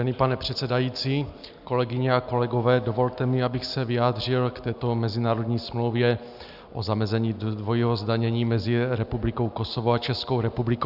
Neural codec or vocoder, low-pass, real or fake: none; 5.4 kHz; real